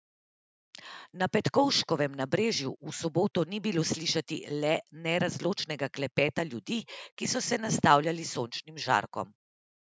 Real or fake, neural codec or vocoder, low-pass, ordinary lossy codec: real; none; none; none